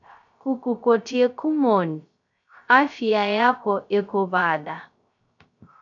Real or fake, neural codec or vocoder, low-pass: fake; codec, 16 kHz, 0.3 kbps, FocalCodec; 7.2 kHz